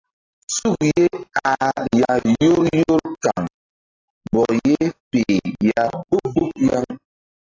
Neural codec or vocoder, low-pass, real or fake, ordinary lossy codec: none; 7.2 kHz; real; AAC, 32 kbps